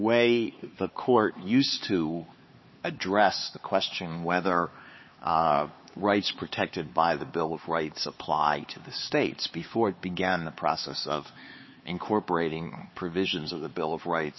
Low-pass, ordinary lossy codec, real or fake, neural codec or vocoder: 7.2 kHz; MP3, 24 kbps; fake; codec, 16 kHz, 4 kbps, X-Codec, HuBERT features, trained on LibriSpeech